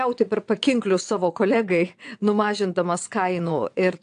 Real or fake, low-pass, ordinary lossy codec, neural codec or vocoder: fake; 9.9 kHz; AAC, 64 kbps; vocoder, 22.05 kHz, 80 mel bands, WaveNeXt